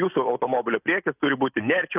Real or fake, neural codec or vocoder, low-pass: real; none; 3.6 kHz